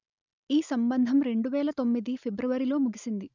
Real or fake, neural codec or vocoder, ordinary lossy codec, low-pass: real; none; none; 7.2 kHz